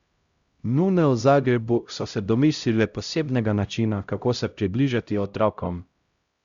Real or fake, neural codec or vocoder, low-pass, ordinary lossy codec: fake; codec, 16 kHz, 0.5 kbps, X-Codec, HuBERT features, trained on LibriSpeech; 7.2 kHz; Opus, 64 kbps